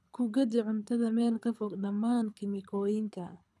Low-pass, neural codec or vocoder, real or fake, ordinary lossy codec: none; codec, 24 kHz, 6 kbps, HILCodec; fake; none